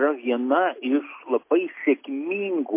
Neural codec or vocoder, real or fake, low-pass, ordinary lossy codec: none; real; 3.6 kHz; MP3, 24 kbps